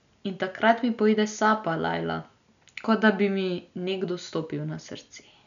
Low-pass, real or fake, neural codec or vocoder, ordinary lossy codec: 7.2 kHz; real; none; none